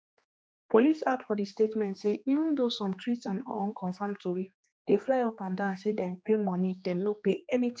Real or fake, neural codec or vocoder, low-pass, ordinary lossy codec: fake; codec, 16 kHz, 2 kbps, X-Codec, HuBERT features, trained on general audio; none; none